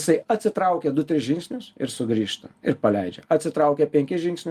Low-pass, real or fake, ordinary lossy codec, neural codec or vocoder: 14.4 kHz; real; Opus, 32 kbps; none